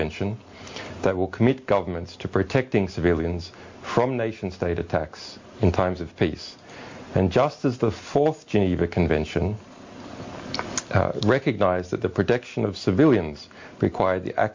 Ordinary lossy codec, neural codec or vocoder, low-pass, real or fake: MP3, 48 kbps; none; 7.2 kHz; real